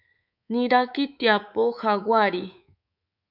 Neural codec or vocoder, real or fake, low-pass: codec, 24 kHz, 3.1 kbps, DualCodec; fake; 5.4 kHz